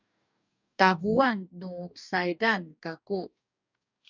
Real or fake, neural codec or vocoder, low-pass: fake; codec, 44.1 kHz, 2.6 kbps, DAC; 7.2 kHz